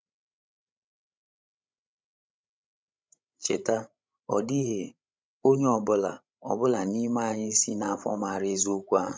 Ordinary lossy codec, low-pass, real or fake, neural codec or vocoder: none; none; fake; codec, 16 kHz, 16 kbps, FreqCodec, larger model